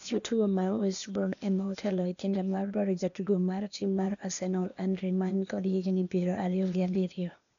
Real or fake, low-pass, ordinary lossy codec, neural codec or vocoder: fake; 7.2 kHz; none; codec, 16 kHz, 0.8 kbps, ZipCodec